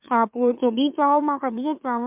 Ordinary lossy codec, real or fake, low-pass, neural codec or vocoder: MP3, 32 kbps; fake; 3.6 kHz; autoencoder, 44.1 kHz, a latent of 192 numbers a frame, MeloTTS